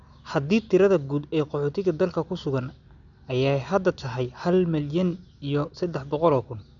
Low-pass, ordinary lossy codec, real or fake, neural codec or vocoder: 7.2 kHz; none; real; none